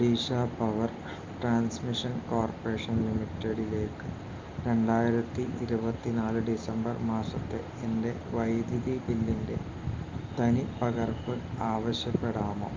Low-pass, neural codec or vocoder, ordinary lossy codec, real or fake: 7.2 kHz; none; Opus, 32 kbps; real